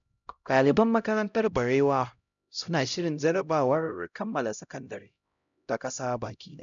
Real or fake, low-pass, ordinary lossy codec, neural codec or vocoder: fake; 7.2 kHz; none; codec, 16 kHz, 0.5 kbps, X-Codec, HuBERT features, trained on LibriSpeech